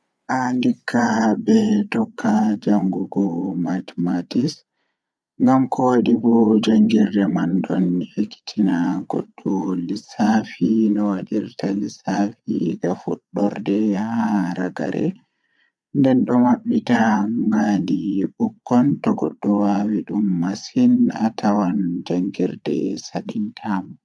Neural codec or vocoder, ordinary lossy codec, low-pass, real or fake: vocoder, 22.05 kHz, 80 mel bands, Vocos; none; none; fake